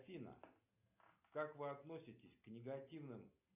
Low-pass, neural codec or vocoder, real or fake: 3.6 kHz; none; real